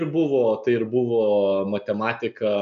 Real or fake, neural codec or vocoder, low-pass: real; none; 7.2 kHz